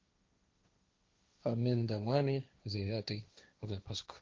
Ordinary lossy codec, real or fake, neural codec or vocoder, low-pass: Opus, 32 kbps; fake; codec, 16 kHz, 1.1 kbps, Voila-Tokenizer; 7.2 kHz